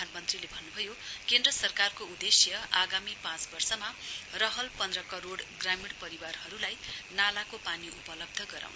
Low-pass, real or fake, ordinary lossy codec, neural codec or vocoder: none; real; none; none